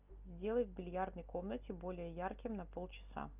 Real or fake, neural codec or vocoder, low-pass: real; none; 3.6 kHz